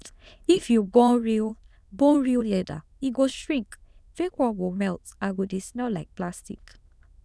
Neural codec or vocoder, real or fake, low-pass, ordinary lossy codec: autoencoder, 22.05 kHz, a latent of 192 numbers a frame, VITS, trained on many speakers; fake; none; none